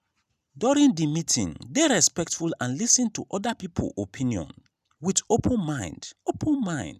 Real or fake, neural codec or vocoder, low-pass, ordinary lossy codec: real; none; 14.4 kHz; none